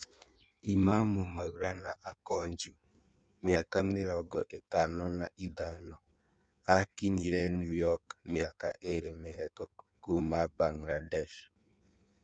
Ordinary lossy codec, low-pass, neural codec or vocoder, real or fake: MP3, 96 kbps; 9.9 kHz; codec, 16 kHz in and 24 kHz out, 1.1 kbps, FireRedTTS-2 codec; fake